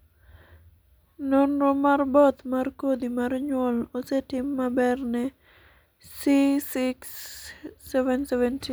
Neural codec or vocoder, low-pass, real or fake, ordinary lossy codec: none; none; real; none